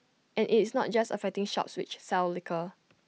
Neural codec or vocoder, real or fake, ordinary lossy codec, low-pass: none; real; none; none